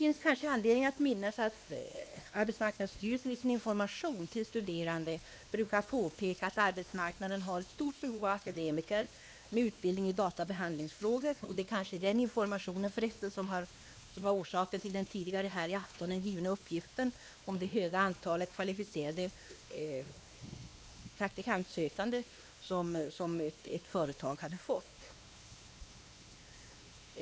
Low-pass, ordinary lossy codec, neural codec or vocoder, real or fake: none; none; codec, 16 kHz, 2 kbps, X-Codec, WavLM features, trained on Multilingual LibriSpeech; fake